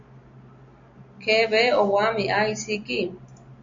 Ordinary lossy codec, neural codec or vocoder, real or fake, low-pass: AAC, 64 kbps; none; real; 7.2 kHz